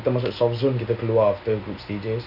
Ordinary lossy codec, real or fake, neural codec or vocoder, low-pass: none; real; none; 5.4 kHz